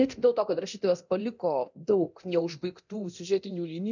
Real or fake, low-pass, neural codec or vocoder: fake; 7.2 kHz; codec, 24 kHz, 0.9 kbps, DualCodec